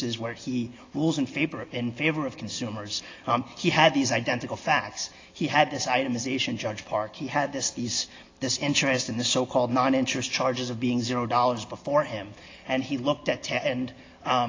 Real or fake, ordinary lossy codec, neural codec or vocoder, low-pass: real; AAC, 32 kbps; none; 7.2 kHz